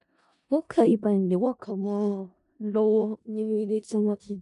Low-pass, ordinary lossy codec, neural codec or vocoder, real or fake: 10.8 kHz; none; codec, 16 kHz in and 24 kHz out, 0.4 kbps, LongCat-Audio-Codec, four codebook decoder; fake